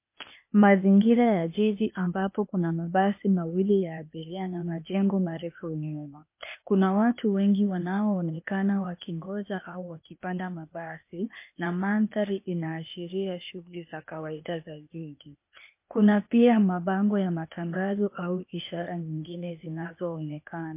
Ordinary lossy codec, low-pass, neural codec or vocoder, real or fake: MP3, 24 kbps; 3.6 kHz; codec, 16 kHz, 0.8 kbps, ZipCodec; fake